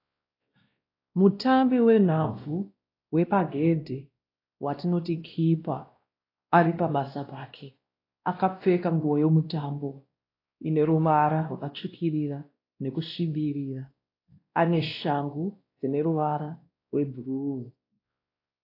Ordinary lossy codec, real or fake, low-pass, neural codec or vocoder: AAC, 32 kbps; fake; 5.4 kHz; codec, 16 kHz, 1 kbps, X-Codec, WavLM features, trained on Multilingual LibriSpeech